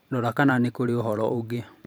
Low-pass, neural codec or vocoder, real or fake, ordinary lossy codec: none; vocoder, 44.1 kHz, 128 mel bands every 512 samples, BigVGAN v2; fake; none